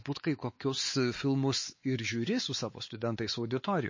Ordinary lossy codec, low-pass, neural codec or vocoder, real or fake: MP3, 32 kbps; 7.2 kHz; codec, 16 kHz, 4 kbps, X-Codec, WavLM features, trained on Multilingual LibriSpeech; fake